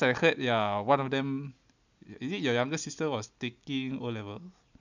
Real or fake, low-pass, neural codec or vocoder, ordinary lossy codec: real; 7.2 kHz; none; none